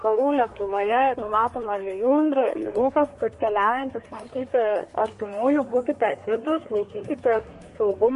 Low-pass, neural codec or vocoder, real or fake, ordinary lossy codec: 10.8 kHz; codec, 24 kHz, 1 kbps, SNAC; fake; MP3, 48 kbps